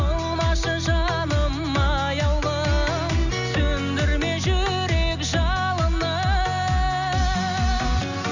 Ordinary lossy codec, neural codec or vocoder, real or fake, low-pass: none; none; real; 7.2 kHz